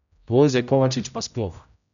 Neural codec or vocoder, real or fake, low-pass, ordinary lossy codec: codec, 16 kHz, 0.5 kbps, X-Codec, HuBERT features, trained on general audio; fake; 7.2 kHz; none